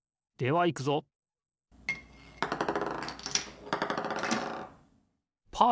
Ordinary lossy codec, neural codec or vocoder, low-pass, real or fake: none; none; none; real